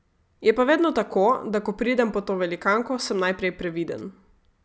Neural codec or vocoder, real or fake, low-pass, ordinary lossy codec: none; real; none; none